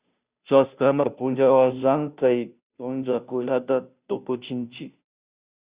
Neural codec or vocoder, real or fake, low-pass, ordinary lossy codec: codec, 16 kHz, 0.5 kbps, FunCodec, trained on Chinese and English, 25 frames a second; fake; 3.6 kHz; Opus, 64 kbps